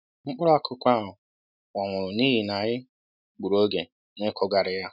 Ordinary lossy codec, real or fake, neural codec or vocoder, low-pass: none; real; none; 5.4 kHz